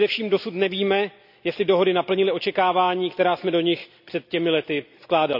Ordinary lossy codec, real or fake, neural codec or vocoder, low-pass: none; real; none; 5.4 kHz